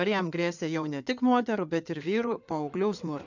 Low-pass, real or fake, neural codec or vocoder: 7.2 kHz; fake; codec, 16 kHz in and 24 kHz out, 2.2 kbps, FireRedTTS-2 codec